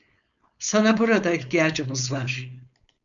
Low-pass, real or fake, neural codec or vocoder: 7.2 kHz; fake; codec, 16 kHz, 4.8 kbps, FACodec